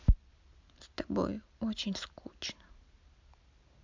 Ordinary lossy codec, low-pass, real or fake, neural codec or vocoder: MP3, 64 kbps; 7.2 kHz; real; none